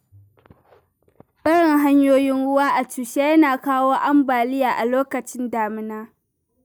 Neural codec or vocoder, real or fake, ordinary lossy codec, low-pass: none; real; none; none